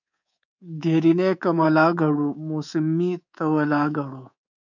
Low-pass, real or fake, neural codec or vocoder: 7.2 kHz; fake; codec, 24 kHz, 3.1 kbps, DualCodec